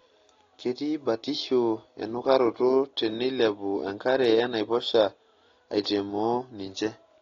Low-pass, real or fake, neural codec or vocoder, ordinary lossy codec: 7.2 kHz; real; none; AAC, 32 kbps